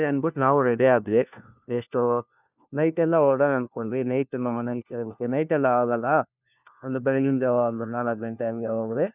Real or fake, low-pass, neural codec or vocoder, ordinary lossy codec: fake; 3.6 kHz; codec, 16 kHz, 1 kbps, FunCodec, trained on LibriTTS, 50 frames a second; none